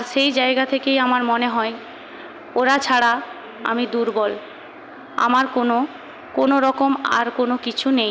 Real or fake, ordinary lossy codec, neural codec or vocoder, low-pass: real; none; none; none